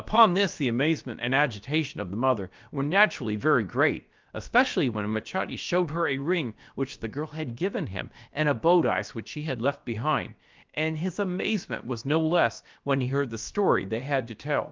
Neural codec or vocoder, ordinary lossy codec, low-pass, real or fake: codec, 16 kHz, about 1 kbps, DyCAST, with the encoder's durations; Opus, 24 kbps; 7.2 kHz; fake